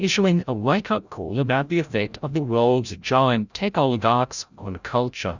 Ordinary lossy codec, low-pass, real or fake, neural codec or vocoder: Opus, 64 kbps; 7.2 kHz; fake; codec, 16 kHz, 0.5 kbps, FreqCodec, larger model